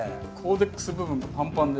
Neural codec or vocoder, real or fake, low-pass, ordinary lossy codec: none; real; none; none